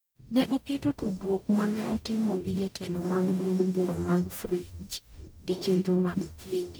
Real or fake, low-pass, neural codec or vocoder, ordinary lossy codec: fake; none; codec, 44.1 kHz, 0.9 kbps, DAC; none